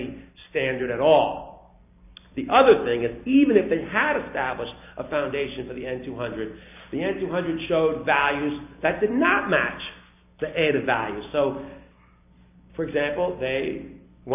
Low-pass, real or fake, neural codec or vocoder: 3.6 kHz; real; none